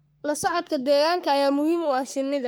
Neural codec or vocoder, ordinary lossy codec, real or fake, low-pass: codec, 44.1 kHz, 3.4 kbps, Pupu-Codec; none; fake; none